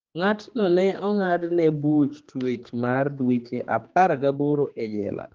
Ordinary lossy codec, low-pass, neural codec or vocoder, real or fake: Opus, 24 kbps; 7.2 kHz; codec, 16 kHz, 2 kbps, X-Codec, HuBERT features, trained on general audio; fake